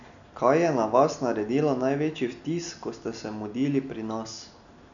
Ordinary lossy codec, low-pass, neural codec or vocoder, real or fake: none; 7.2 kHz; none; real